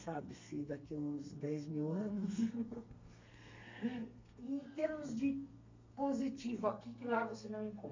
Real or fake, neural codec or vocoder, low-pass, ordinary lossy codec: fake; codec, 32 kHz, 1.9 kbps, SNAC; 7.2 kHz; MP3, 64 kbps